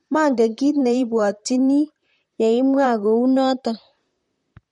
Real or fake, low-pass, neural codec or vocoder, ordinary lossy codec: fake; 19.8 kHz; vocoder, 44.1 kHz, 128 mel bands, Pupu-Vocoder; MP3, 48 kbps